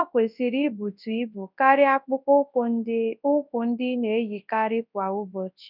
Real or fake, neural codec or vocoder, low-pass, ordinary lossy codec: fake; codec, 24 kHz, 0.9 kbps, WavTokenizer, large speech release; 5.4 kHz; none